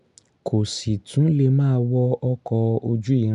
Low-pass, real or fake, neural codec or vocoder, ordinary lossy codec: 10.8 kHz; real; none; none